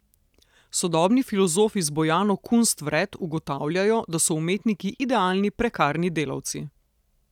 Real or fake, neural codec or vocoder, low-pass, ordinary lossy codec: real; none; 19.8 kHz; none